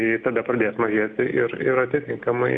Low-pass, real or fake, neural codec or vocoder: 9.9 kHz; real; none